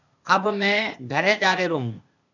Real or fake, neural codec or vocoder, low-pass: fake; codec, 16 kHz, 0.8 kbps, ZipCodec; 7.2 kHz